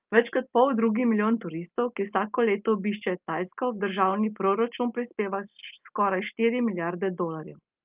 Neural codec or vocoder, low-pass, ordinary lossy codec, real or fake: none; 3.6 kHz; Opus, 24 kbps; real